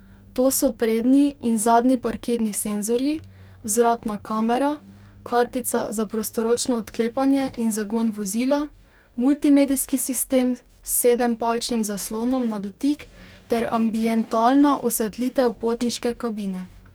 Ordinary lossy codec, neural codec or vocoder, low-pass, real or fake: none; codec, 44.1 kHz, 2.6 kbps, DAC; none; fake